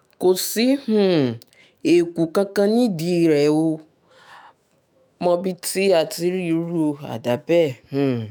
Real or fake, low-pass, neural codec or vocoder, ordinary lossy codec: fake; none; autoencoder, 48 kHz, 128 numbers a frame, DAC-VAE, trained on Japanese speech; none